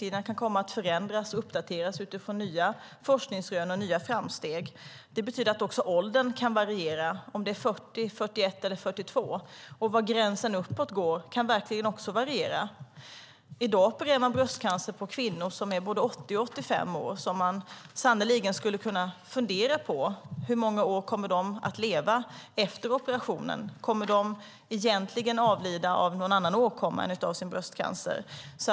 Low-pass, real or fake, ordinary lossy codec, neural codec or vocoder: none; real; none; none